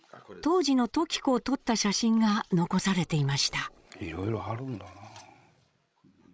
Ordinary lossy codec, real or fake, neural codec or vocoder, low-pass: none; fake; codec, 16 kHz, 16 kbps, FreqCodec, larger model; none